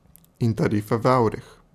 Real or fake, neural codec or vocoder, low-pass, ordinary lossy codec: real; none; 14.4 kHz; none